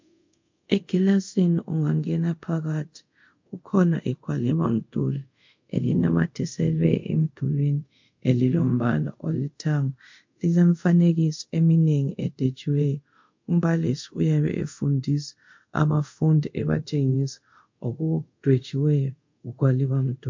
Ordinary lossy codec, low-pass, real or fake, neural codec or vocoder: MP3, 48 kbps; 7.2 kHz; fake; codec, 24 kHz, 0.5 kbps, DualCodec